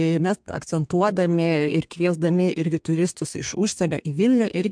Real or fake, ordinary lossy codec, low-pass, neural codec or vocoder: fake; MP3, 64 kbps; 9.9 kHz; codec, 32 kHz, 1.9 kbps, SNAC